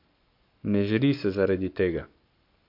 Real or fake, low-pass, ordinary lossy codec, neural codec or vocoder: fake; 5.4 kHz; MP3, 48 kbps; codec, 44.1 kHz, 7.8 kbps, Pupu-Codec